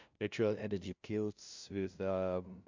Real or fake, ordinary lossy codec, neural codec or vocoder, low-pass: fake; MP3, 64 kbps; codec, 16 kHz, 0.5 kbps, FunCodec, trained on LibriTTS, 25 frames a second; 7.2 kHz